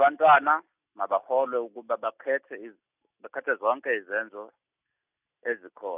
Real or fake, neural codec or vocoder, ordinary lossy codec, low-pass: real; none; none; 3.6 kHz